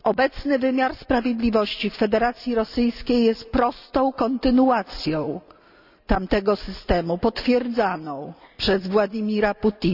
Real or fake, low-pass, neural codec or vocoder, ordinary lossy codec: real; 5.4 kHz; none; none